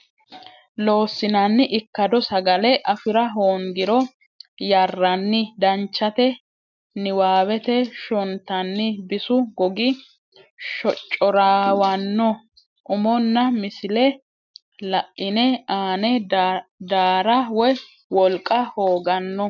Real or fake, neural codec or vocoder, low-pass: real; none; 7.2 kHz